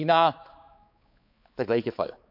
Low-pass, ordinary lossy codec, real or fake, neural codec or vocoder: 5.4 kHz; MP3, 48 kbps; fake; codec, 16 kHz, 4 kbps, X-Codec, HuBERT features, trained on balanced general audio